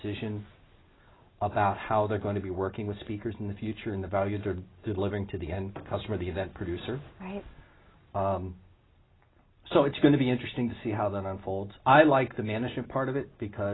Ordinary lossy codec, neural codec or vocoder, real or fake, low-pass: AAC, 16 kbps; none; real; 7.2 kHz